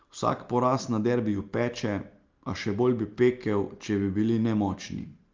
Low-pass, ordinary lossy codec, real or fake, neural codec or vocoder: 7.2 kHz; Opus, 32 kbps; real; none